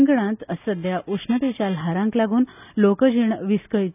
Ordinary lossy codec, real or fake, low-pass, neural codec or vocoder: none; real; 3.6 kHz; none